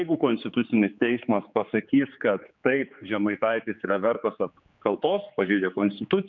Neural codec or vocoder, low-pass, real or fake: codec, 16 kHz, 4 kbps, X-Codec, HuBERT features, trained on general audio; 7.2 kHz; fake